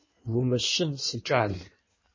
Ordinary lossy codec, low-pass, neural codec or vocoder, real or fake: MP3, 32 kbps; 7.2 kHz; codec, 16 kHz in and 24 kHz out, 1.1 kbps, FireRedTTS-2 codec; fake